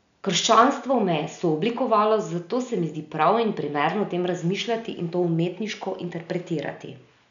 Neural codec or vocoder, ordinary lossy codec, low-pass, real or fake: none; none; 7.2 kHz; real